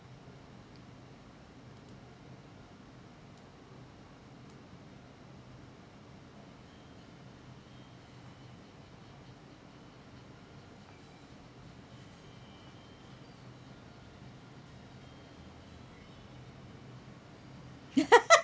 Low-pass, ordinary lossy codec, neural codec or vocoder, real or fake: none; none; none; real